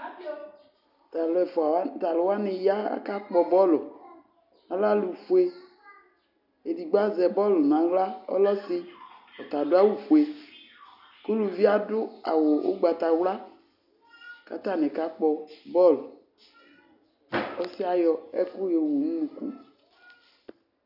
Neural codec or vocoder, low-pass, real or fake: none; 5.4 kHz; real